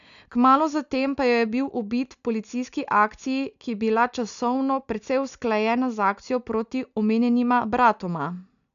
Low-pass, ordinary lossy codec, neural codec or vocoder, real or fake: 7.2 kHz; none; none; real